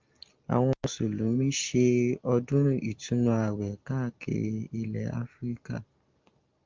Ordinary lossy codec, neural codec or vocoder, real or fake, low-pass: Opus, 32 kbps; none; real; 7.2 kHz